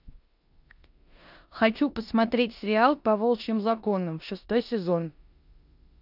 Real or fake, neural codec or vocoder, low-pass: fake; codec, 16 kHz in and 24 kHz out, 0.9 kbps, LongCat-Audio-Codec, four codebook decoder; 5.4 kHz